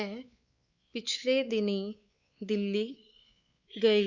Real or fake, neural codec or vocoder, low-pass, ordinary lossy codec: fake; codec, 16 kHz, 4 kbps, X-Codec, WavLM features, trained on Multilingual LibriSpeech; 7.2 kHz; Opus, 64 kbps